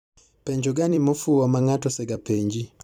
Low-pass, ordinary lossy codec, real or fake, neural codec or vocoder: 19.8 kHz; none; fake; vocoder, 44.1 kHz, 128 mel bands every 256 samples, BigVGAN v2